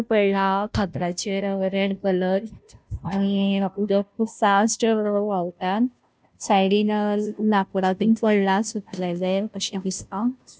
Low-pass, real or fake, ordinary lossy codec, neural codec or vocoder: none; fake; none; codec, 16 kHz, 0.5 kbps, FunCodec, trained on Chinese and English, 25 frames a second